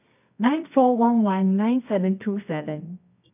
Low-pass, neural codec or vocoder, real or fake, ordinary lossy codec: 3.6 kHz; codec, 24 kHz, 0.9 kbps, WavTokenizer, medium music audio release; fake; none